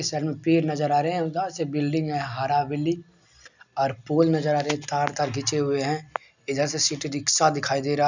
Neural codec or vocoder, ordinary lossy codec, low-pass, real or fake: none; none; 7.2 kHz; real